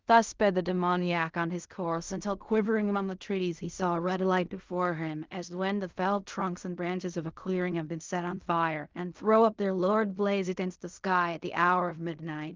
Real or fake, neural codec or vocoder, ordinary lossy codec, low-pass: fake; codec, 16 kHz in and 24 kHz out, 0.4 kbps, LongCat-Audio-Codec, fine tuned four codebook decoder; Opus, 32 kbps; 7.2 kHz